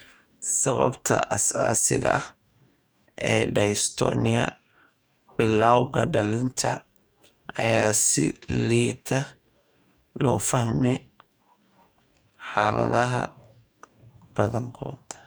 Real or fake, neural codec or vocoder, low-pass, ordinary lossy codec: fake; codec, 44.1 kHz, 2.6 kbps, DAC; none; none